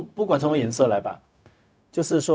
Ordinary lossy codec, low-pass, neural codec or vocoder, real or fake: none; none; codec, 16 kHz, 0.4 kbps, LongCat-Audio-Codec; fake